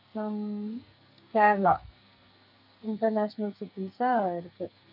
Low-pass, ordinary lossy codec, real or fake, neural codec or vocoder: 5.4 kHz; none; fake; codec, 44.1 kHz, 2.6 kbps, SNAC